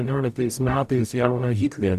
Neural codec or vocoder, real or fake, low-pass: codec, 44.1 kHz, 0.9 kbps, DAC; fake; 14.4 kHz